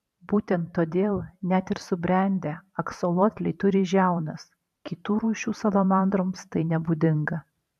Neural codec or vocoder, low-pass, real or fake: vocoder, 44.1 kHz, 128 mel bands every 512 samples, BigVGAN v2; 14.4 kHz; fake